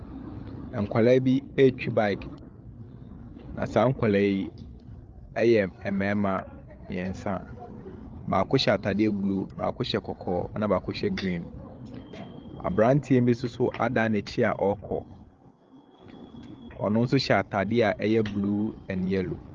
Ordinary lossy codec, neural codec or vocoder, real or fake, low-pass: Opus, 32 kbps; codec, 16 kHz, 8 kbps, FreqCodec, larger model; fake; 7.2 kHz